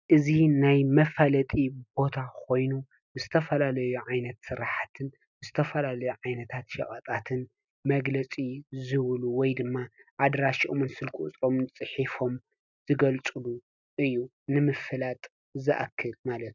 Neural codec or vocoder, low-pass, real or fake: none; 7.2 kHz; real